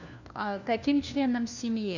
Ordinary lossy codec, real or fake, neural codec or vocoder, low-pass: none; fake; codec, 16 kHz, 1 kbps, FunCodec, trained on LibriTTS, 50 frames a second; 7.2 kHz